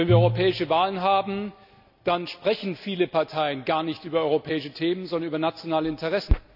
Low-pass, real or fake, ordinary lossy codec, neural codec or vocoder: 5.4 kHz; real; none; none